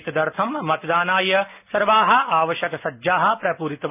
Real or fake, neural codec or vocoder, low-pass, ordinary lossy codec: real; none; 3.6 kHz; none